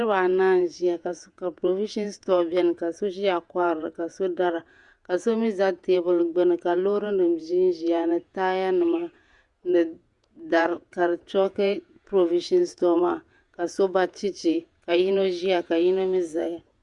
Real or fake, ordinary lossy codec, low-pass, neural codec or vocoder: fake; Opus, 64 kbps; 10.8 kHz; vocoder, 48 kHz, 128 mel bands, Vocos